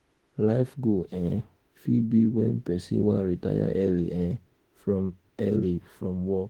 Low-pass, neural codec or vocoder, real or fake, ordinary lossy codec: 19.8 kHz; autoencoder, 48 kHz, 32 numbers a frame, DAC-VAE, trained on Japanese speech; fake; Opus, 24 kbps